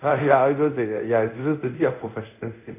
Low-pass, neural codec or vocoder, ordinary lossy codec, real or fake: 3.6 kHz; codec, 24 kHz, 0.5 kbps, DualCodec; none; fake